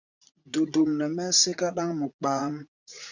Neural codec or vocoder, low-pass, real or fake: vocoder, 44.1 kHz, 80 mel bands, Vocos; 7.2 kHz; fake